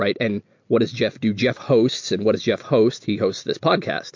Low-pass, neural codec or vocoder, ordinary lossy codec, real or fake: 7.2 kHz; none; MP3, 48 kbps; real